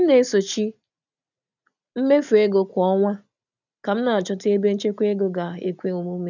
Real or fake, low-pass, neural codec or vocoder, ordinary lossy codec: real; 7.2 kHz; none; none